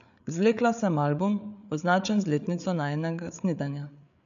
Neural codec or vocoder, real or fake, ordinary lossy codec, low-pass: codec, 16 kHz, 8 kbps, FreqCodec, larger model; fake; none; 7.2 kHz